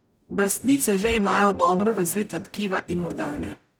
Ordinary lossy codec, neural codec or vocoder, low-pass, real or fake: none; codec, 44.1 kHz, 0.9 kbps, DAC; none; fake